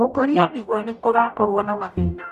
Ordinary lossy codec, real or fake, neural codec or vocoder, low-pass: none; fake; codec, 44.1 kHz, 0.9 kbps, DAC; 14.4 kHz